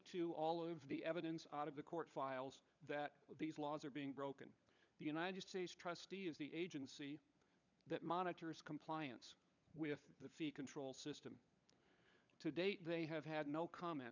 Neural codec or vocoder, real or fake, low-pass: codec, 16 kHz, 16 kbps, FunCodec, trained on LibriTTS, 50 frames a second; fake; 7.2 kHz